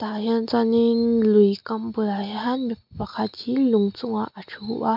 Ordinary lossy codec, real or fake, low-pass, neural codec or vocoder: MP3, 48 kbps; real; 5.4 kHz; none